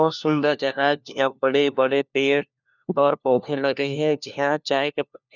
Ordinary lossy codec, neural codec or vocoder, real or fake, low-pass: none; codec, 16 kHz, 1 kbps, FunCodec, trained on LibriTTS, 50 frames a second; fake; 7.2 kHz